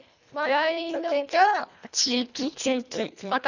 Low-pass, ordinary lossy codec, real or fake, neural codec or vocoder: 7.2 kHz; none; fake; codec, 24 kHz, 1.5 kbps, HILCodec